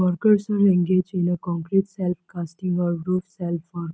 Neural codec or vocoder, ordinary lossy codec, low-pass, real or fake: none; none; none; real